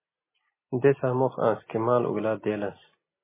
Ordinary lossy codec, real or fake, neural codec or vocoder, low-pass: MP3, 16 kbps; real; none; 3.6 kHz